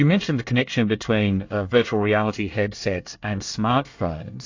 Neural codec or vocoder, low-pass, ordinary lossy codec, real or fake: codec, 24 kHz, 1 kbps, SNAC; 7.2 kHz; AAC, 48 kbps; fake